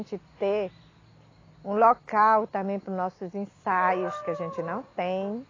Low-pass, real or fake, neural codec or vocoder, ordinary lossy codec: 7.2 kHz; real; none; AAC, 32 kbps